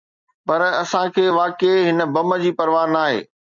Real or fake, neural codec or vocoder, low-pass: real; none; 7.2 kHz